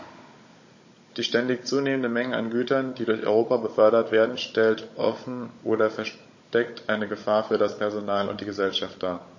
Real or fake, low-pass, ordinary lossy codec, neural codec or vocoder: fake; 7.2 kHz; MP3, 32 kbps; codec, 16 kHz, 16 kbps, FunCodec, trained on Chinese and English, 50 frames a second